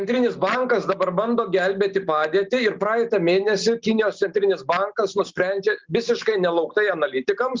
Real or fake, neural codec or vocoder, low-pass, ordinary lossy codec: real; none; 7.2 kHz; Opus, 24 kbps